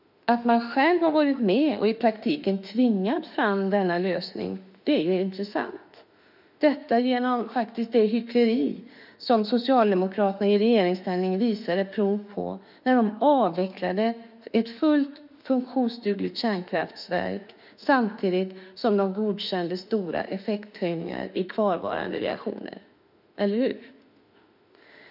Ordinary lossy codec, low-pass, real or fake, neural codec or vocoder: none; 5.4 kHz; fake; autoencoder, 48 kHz, 32 numbers a frame, DAC-VAE, trained on Japanese speech